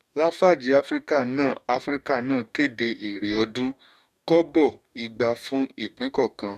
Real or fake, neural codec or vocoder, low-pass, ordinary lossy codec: fake; codec, 44.1 kHz, 2.6 kbps, DAC; 14.4 kHz; none